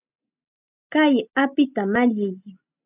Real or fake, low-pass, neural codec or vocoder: real; 3.6 kHz; none